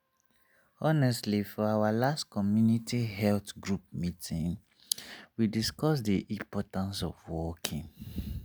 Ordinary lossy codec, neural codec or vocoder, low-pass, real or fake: none; none; none; real